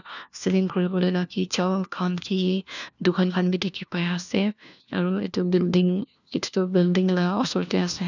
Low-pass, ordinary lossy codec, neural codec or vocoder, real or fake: 7.2 kHz; none; codec, 16 kHz, 1 kbps, FunCodec, trained on LibriTTS, 50 frames a second; fake